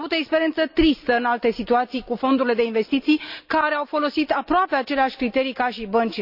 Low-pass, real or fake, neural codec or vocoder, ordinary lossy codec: 5.4 kHz; real; none; none